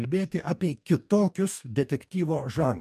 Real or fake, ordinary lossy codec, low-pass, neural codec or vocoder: fake; MP3, 96 kbps; 14.4 kHz; codec, 44.1 kHz, 2.6 kbps, DAC